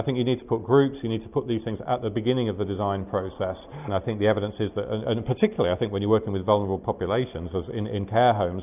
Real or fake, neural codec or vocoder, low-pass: real; none; 3.6 kHz